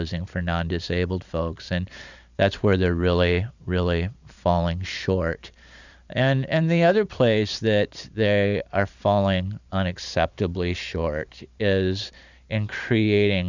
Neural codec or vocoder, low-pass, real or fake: vocoder, 44.1 kHz, 128 mel bands every 512 samples, BigVGAN v2; 7.2 kHz; fake